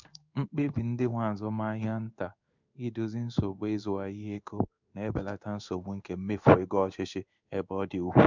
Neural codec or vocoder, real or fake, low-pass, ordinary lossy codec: codec, 16 kHz in and 24 kHz out, 1 kbps, XY-Tokenizer; fake; 7.2 kHz; none